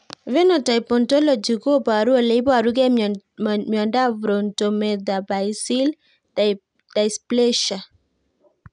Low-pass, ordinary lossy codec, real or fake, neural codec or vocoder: 9.9 kHz; none; real; none